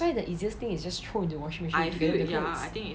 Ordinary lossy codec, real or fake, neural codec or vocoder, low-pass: none; real; none; none